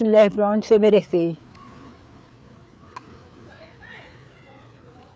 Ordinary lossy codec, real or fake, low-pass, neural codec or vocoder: none; fake; none; codec, 16 kHz, 4 kbps, FreqCodec, larger model